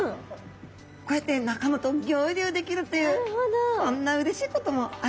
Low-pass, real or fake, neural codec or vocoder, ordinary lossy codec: none; real; none; none